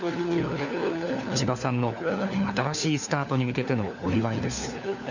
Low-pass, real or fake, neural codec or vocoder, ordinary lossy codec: 7.2 kHz; fake; codec, 16 kHz, 4 kbps, FunCodec, trained on LibriTTS, 50 frames a second; none